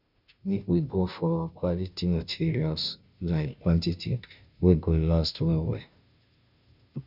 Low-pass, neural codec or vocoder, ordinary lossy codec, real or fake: 5.4 kHz; codec, 16 kHz, 0.5 kbps, FunCodec, trained on Chinese and English, 25 frames a second; none; fake